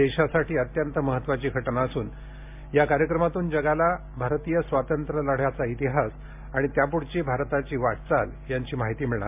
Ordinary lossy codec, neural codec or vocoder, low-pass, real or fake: MP3, 32 kbps; none; 3.6 kHz; real